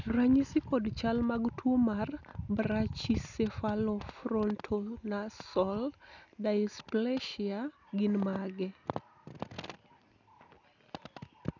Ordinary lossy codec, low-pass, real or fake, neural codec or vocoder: none; 7.2 kHz; real; none